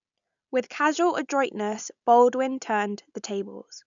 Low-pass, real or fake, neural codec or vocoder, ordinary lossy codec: 7.2 kHz; real; none; none